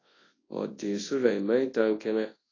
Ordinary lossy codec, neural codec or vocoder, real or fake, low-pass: AAC, 32 kbps; codec, 24 kHz, 0.9 kbps, WavTokenizer, large speech release; fake; 7.2 kHz